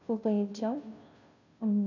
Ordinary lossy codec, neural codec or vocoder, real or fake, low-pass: none; codec, 16 kHz, 0.5 kbps, FunCodec, trained on Chinese and English, 25 frames a second; fake; 7.2 kHz